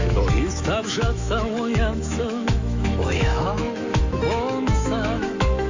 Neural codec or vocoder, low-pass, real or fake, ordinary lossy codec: autoencoder, 48 kHz, 128 numbers a frame, DAC-VAE, trained on Japanese speech; 7.2 kHz; fake; AAC, 48 kbps